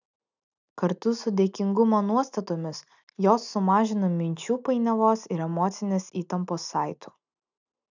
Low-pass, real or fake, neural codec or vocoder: 7.2 kHz; real; none